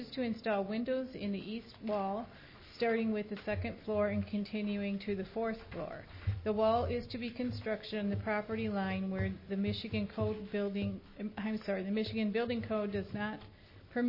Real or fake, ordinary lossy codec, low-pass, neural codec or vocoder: real; MP3, 24 kbps; 5.4 kHz; none